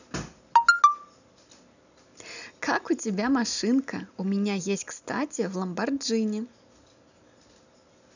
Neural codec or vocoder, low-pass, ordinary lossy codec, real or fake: none; 7.2 kHz; none; real